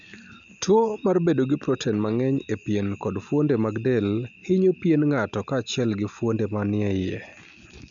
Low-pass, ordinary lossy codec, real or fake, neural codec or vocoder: 7.2 kHz; none; real; none